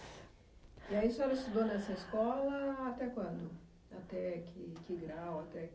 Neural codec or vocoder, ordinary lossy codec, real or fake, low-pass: none; none; real; none